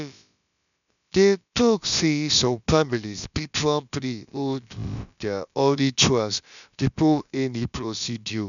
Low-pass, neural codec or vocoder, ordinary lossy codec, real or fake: 7.2 kHz; codec, 16 kHz, about 1 kbps, DyCAST, with the encoder's durations; none; fake